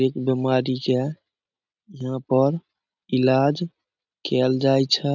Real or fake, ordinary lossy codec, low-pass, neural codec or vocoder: real; none; 7.2 kHz; none